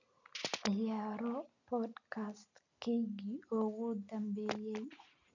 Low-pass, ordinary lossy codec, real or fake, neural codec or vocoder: 7.2 kHz; none; real; none